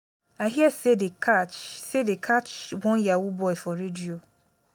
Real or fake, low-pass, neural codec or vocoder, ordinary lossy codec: real; none; none; none